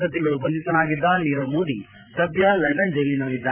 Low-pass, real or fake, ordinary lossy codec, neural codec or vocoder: 3.6 kHz; fake; none; codec, 16 kHz, 8 kbps, FreqCodec, larger model